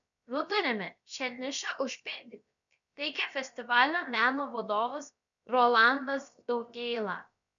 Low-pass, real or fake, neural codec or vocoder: 7.2 kHz; fake; codec, 16 kHz, about 1 kbps, DyCAST, with the encoder's durations